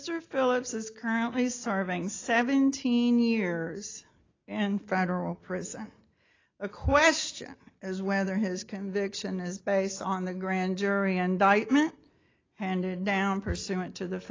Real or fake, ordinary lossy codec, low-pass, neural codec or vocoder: real; AAC, 32 kbps; 7.2 kHz; none